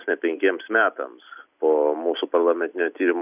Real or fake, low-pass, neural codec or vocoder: real; 3.6 kHz; none